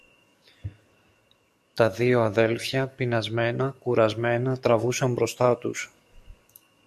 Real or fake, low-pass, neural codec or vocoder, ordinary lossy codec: fake; 14.4 kHz; codec, 44.1 kHz, 7.8 kbps, DAC; MP3, 64 kbps